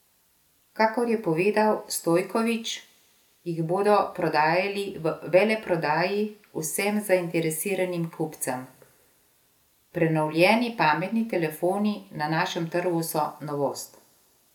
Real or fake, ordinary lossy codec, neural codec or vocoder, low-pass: real; none; none; 19.8 kHz